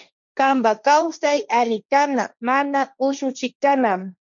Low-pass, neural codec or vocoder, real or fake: 7.2 kHz; codec, 16 kHz, 1.1 kbps, Voila-Tokenizer; fake